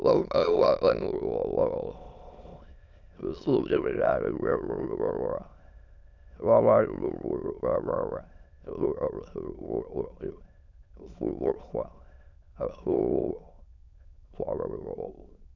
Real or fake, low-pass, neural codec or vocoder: fake; 7.2 kHz; autoencoder, 22.05 kHz, a latent of 192 numbers a frame, VITS, trained on many speakers